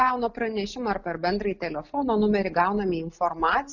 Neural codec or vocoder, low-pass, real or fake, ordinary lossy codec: none; 7.2 kHz; real; Opus, 64 kbps